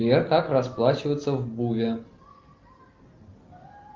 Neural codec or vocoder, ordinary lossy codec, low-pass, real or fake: none; Opus, 24 kbps; 7.2 kHz; real